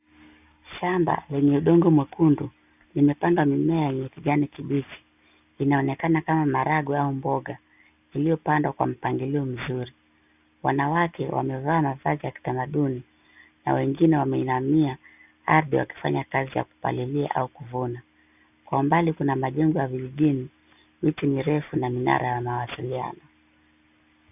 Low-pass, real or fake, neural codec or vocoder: 3.6 kHz; real; none